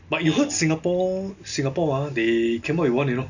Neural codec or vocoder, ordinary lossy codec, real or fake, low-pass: none; none; real; 7.2 kHz